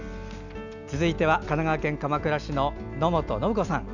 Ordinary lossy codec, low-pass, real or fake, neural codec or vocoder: none; 7.2 kHz; real; none